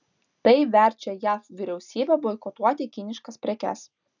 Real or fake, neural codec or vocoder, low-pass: real; none; 7.2 kHz